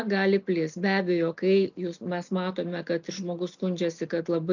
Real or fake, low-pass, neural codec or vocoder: real; 7.2 kHz; none